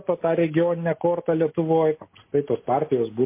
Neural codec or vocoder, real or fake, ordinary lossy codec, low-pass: none; real; MP3, 24 kbps; 3.6 kHz